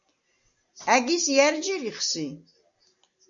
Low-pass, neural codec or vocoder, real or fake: 7.2 kHz; none; real